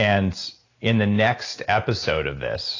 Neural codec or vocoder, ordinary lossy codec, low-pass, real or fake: none; AAC, 32 kbps; 7.2 kHz; real